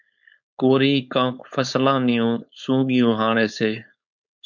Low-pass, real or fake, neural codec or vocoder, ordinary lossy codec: 7.2 kHz; fake; codec, 16 kHz, 4.8 kbps, FACodec; MP3, 64 kbps